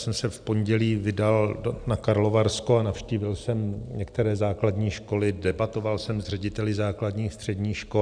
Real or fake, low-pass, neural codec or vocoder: real; 9.9 kHz; none